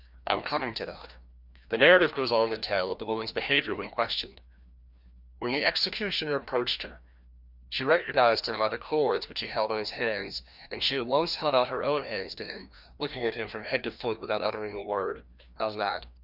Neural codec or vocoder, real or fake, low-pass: codec, 16 kHz, 1 kbps, FreqCodec, larger model; fake; 5.4 kHz